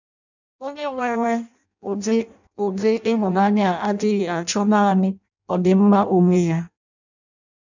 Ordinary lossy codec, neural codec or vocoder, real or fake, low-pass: none; codec, 16 kHz in and 24 kHz out, 0.6 kbps, FireRedTTS-2 codec; fake; 7.2 kHz